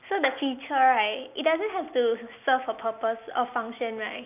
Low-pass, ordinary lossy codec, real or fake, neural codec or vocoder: 3.6 kHz; none; real; none